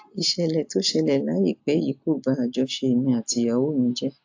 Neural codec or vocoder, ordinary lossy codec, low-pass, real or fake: none; AAC, 48 kbps; 7.2 kHz; real